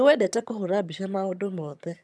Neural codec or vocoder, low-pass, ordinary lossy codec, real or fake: vocoder, 22.05 kHz, 80 mel bands, HiFi-GAN; none; none; fake